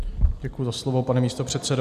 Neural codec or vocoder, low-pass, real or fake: none; 14.4 kHz; real